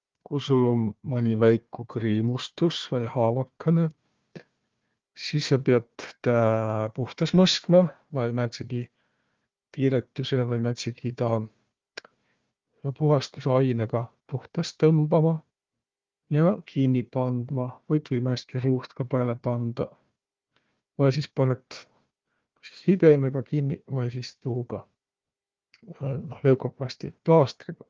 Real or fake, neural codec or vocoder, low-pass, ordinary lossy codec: fake; codec, 16 kHz, 1 kbps, FunCodec, trained on Chinese and English, 50 frames a second; 7.2 kHz; Opus, 32 kbps